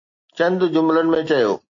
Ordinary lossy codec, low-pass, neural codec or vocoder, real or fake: AAC, 48 kbps; 7.2 kHz; none; real